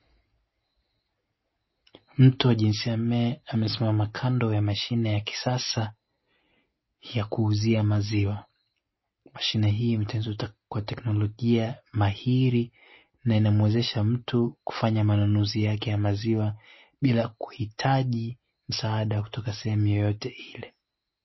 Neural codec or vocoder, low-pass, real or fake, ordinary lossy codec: none; 7.2 kHz; real; MP3, 24 kbps